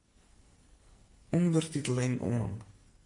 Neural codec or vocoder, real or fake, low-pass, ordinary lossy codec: codec, 44.1 kHz, 2.6 kbps, SNAC; fake; 10.8 kHz; MP3, 48 kbps